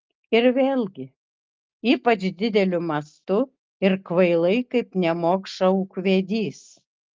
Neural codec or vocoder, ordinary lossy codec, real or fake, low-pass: none; Opus, 32 kbps; real; 7.2 kHz